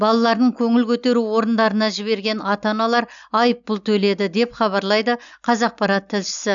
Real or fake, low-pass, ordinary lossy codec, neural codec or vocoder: real; 7.2 kHz; none; none